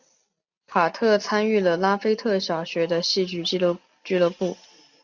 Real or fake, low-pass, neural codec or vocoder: real; 7.2 kHz; none